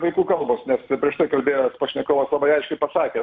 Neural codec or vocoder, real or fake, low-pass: none; real; 7.2 kHz